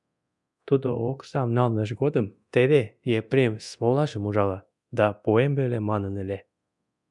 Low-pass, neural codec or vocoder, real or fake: 10.8 kHz; codec, 24 kHz, 0.9 kbps, DualCodec; fake